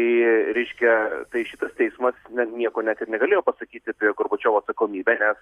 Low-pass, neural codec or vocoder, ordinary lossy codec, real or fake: 14.4 kHz; none; Opus, 64 kbps; real